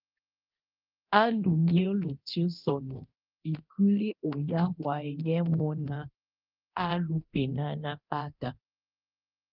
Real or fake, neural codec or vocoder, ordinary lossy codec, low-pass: fake; codec, 24 kHz, 0.9 kbps, DualCodec; Opus, 32 kbps; 5.4 kHz